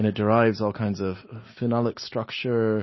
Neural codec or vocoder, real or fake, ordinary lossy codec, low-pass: none; real; MP3, 24 kbps; 7.2 kHz